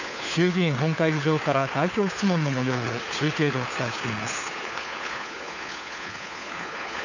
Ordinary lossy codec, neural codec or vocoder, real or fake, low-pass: none; codec, 16 kHz, 4 kbps, FunCodec, trained on LibriTTS, 50 frames a second; fake; 7.2 kHz